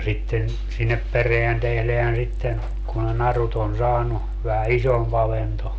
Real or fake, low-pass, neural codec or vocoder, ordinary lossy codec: real; none; none; none